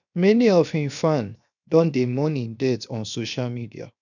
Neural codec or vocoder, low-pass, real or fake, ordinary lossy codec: codec, 16 kHz, 0.7 kbps, FocalCodec; 7.2 kHz; fake; none